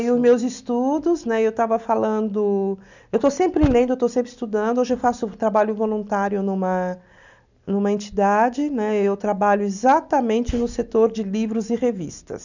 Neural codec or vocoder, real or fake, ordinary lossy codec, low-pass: none; real; none; 7.2 kHz